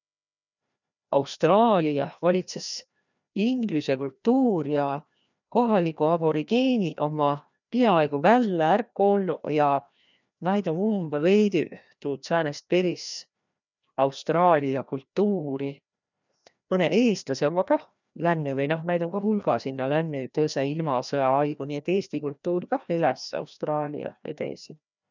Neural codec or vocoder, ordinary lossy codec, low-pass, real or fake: codec, 16 kHz, 1 kbps, FreqCodec, larger model; none; 7.2 kHz; fake